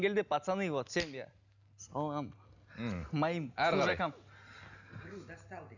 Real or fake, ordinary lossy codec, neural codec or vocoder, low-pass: real; none; none; 7.2 kHz